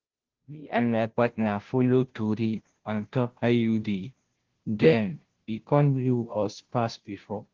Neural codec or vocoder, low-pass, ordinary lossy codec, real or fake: codec, 16 kHz, 0.5 kbps, FunCodec, trained on Chinese and English, 25 frames a second; 7.2 kHz; Opus, 16 kbps; fake